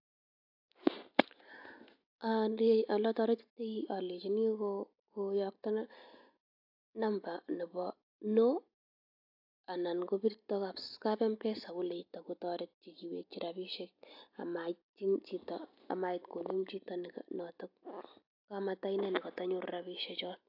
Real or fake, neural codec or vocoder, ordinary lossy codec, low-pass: real; none; AAC, 48 kbps; 5.4 kHz